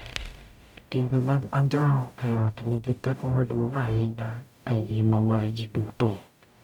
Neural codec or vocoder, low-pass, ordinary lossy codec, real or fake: codec, 44.1 kHz, 0.9 kbps, DAC; 19.8 kHz; none; fake